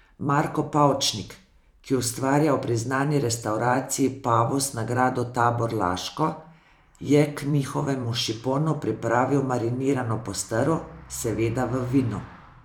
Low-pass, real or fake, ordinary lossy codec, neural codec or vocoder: 19.8 kHz; fake; none; vocoder, 44.1 kHz, 128 mel bands every 512 samples, BigVGAN v2